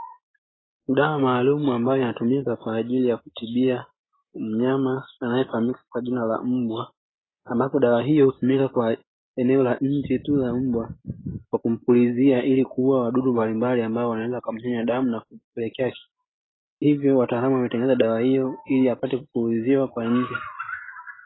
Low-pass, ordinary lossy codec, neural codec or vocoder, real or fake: 7.2 kHz; AAC, 16 kbps; none; real